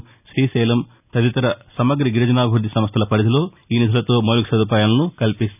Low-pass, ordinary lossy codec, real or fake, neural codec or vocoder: 3.6 kHz; none; real; none